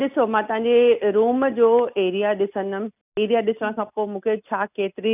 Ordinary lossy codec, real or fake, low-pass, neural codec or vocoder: none; real; 3.6 kHz; none